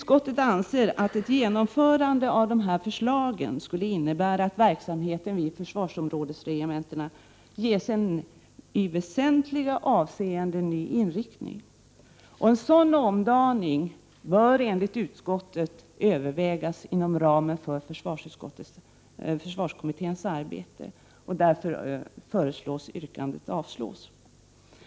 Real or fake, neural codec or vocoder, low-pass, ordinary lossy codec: real; none; none; none